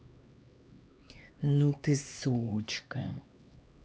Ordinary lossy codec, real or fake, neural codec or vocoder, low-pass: none; fake; codec, 16 kHz, 2 kbps, X-Codec, HuBERT features, trained on LibriSpeech; none